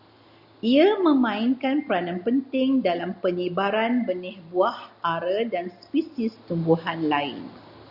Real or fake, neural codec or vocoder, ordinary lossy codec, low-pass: real; none; Opus, 64 kbps; 5.4 kHz